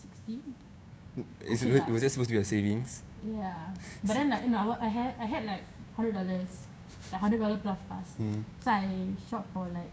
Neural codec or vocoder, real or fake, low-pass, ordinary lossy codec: codec, 16 kHz, 6 kbps, DAC; fake; none; none